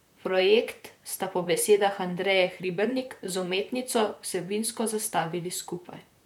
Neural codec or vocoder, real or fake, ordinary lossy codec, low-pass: vocoder, 44.1 kHz, 128 mel bands, Pupu-Vocoder; fake; none; 19.8 kHz